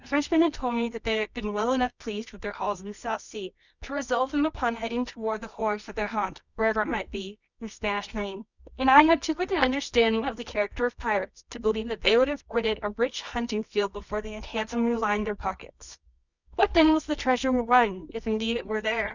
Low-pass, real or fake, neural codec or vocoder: 7.2 kHz; fake; codec, 24 kHz, 0.9 kbps, WavTokenizer, medium music audio release